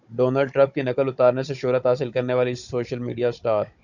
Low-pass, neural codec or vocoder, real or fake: 7.2 kHz; codec, 16 kHz, 16 kbps, FunCodec, trained on Chinese and English, 50 frames a second; fake